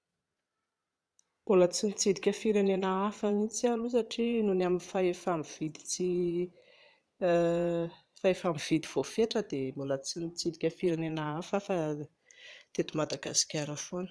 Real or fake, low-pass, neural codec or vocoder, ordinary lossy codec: fake; none; vocoder, 22.05 kHz, 80 mel bands, Vocos; none